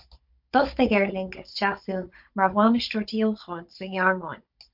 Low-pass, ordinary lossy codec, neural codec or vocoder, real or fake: 5.4 kHz; MP3, 48 kbps; codec, 16 kHz, 16 kbps, FunCodec, trained on Chinese and English, 50 frames a second; fake